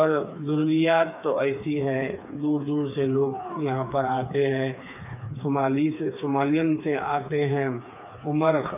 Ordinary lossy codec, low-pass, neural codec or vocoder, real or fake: none; 3.6 kHz; codec, 16 kHz, 4 kbps, FreqCodec, smaller model; fake